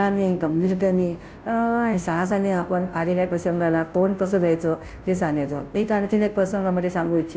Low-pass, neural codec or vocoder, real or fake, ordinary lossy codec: none; codec, 16 kHz, 0.5 kbps, FunCodec, trained on Chinese and English, 25 frames a second; fake; none